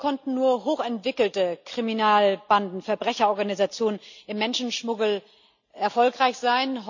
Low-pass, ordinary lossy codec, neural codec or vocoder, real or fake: 7.2 kHz; none; none; real